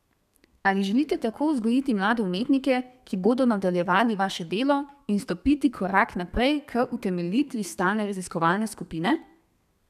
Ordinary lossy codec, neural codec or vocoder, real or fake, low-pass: none; codec, 32 kHz, 1.9 kbps, SNAC; fake; 14.4 kHz